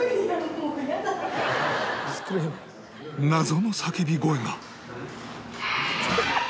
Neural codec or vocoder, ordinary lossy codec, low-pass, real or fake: none; none; none; real